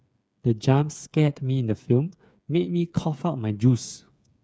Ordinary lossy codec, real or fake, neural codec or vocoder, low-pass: none; fake; codec, 16 kHz, 8 kbps, FreqCodec, smaller model; none